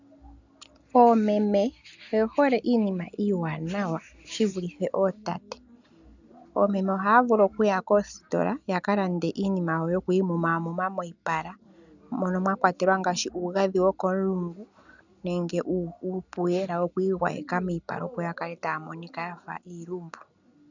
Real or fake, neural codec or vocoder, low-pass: real; none; 7.2 kHz